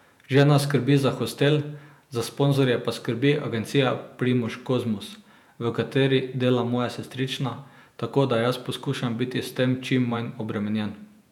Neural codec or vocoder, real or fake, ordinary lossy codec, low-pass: vocoder, 48 kHz, 128 mel bands, Vocos; fake; none; 19.8 kHz